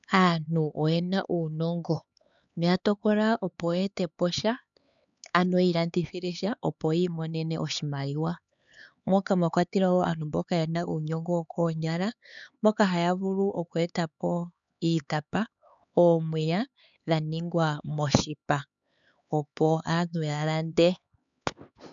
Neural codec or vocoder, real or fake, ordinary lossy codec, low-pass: codec, 16 kHz, 4 kbps, X-Codec, HuBERT features, trained on LibriSpeech; fake; MP3, 96 kbps; 7.2 kHz